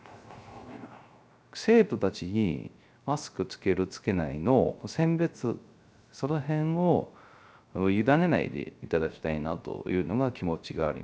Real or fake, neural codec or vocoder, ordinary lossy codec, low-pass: fake; codec, 16 kHz, 0.3 kbps, FocalCodec; none; none